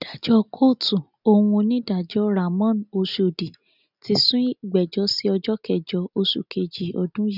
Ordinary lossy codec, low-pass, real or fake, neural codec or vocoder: none; 5.4 kHz; real; none